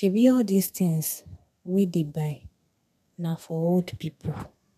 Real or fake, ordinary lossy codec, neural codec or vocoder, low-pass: fake; none; codec, 32 kHz, 1.9 kbps, SNAC; 14.4 kHz